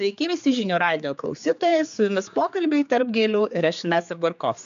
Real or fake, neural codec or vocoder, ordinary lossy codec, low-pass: fake; codec, 16 kHz, 4 kbps, X-Codec, HuBERT features, trained on general audio; MP3, 64 kbps; 7.2 kHz